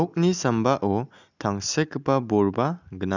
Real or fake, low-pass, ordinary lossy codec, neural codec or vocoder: real; 7.2 kHz; none; none